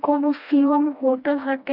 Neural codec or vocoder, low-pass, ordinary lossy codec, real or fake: codec, 16 kHz, 1 kbps, FreqCodec, smaller model; 5.4 kHz; none; fake